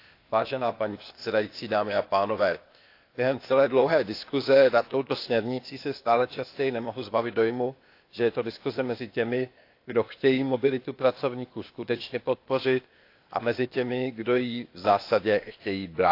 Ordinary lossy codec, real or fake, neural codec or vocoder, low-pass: AAC, 32 kbps; fake; codec, 16 kHz, 0.8 kbps, ZipCodec; 5.4 kHz